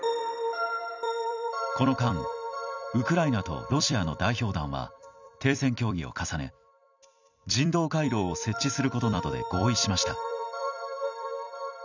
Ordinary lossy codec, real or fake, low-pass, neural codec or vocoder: none; fake; 7.2 kHz; vocoder, 44.1 kHz, 128 mel bands every 256 samples, BigVGAN v2